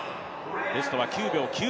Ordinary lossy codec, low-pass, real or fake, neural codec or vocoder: none; none; real; none